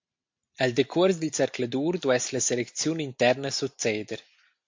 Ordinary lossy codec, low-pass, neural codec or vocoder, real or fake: MP3, 48 kbps; 7.2 kHz; none; real